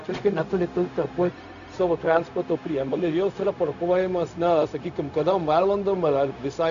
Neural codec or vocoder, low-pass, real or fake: codec, 16 kHz, 0.4 kbps, LongCat-Audio-Codec; 7.2 kHz; fake